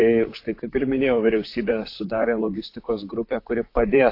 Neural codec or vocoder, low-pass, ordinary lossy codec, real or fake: vocoder, 44.1 kHz, 128 mel bands, Pupu-Vocoder; 5.4 kHz; AAC, 32 kbps; fake